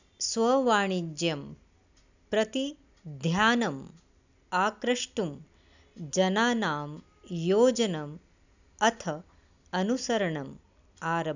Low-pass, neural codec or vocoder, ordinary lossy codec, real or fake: 7.2 kHz; none; none; real